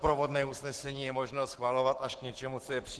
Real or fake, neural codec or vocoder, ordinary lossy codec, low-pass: fake; codec, 44.1 kHz, 7.8 kbps, Pupu-Codec; Opus, 16 kbps; 10.8 kHz